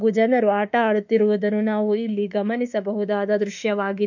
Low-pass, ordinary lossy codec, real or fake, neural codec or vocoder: 7.2 kHz; none; fake; autoencoder, 48 kHz, 32 numbers a frame, DAC-VAE, trained on Japanese speech